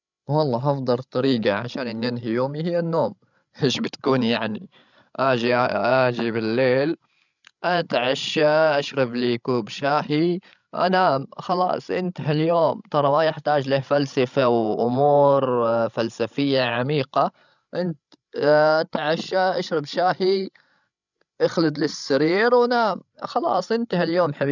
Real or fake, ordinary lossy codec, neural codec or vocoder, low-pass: fake; none; codec, 16 kHz, 16 kbps, FreqCodec, larger model; 7.2 kHz